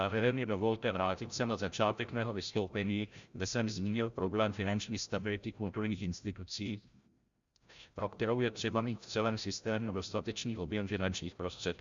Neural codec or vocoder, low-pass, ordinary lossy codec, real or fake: codec, 16 kHz, 0.5 kbps, FreqCodec, larger model; 7.2 kHz; Opus, 64 kbps; fake